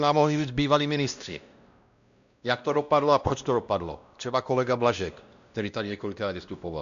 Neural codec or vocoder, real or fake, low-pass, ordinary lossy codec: codec, 16 kHz, 1 kbps, X-Codec, WavLM features, trained on Multilingual LibriSpeech; fake; 7.2 kHz; AAC, 96 kbps